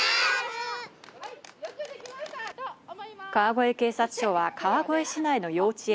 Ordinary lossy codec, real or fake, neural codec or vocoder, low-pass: none; real; none; none